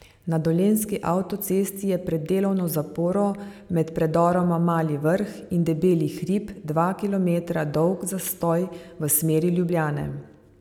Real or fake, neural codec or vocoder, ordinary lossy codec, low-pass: real; none; none; 19.8 kHz